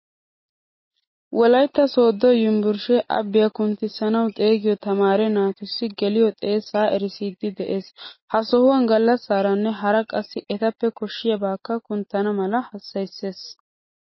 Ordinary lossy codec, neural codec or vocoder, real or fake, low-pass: MP3, 24 kbps; none; real; 7.2 kHz